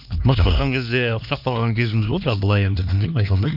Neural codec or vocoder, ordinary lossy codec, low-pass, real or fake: codec, 16 kHz, 2 kbps, X-Codec, HuBERT features, trained on LibriSpeech; none; 5.4 kHz; fake